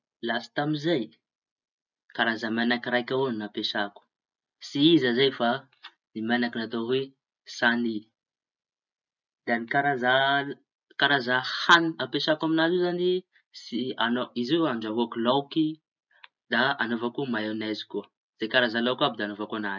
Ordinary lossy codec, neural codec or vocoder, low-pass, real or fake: none; none; 7.2 kHz; real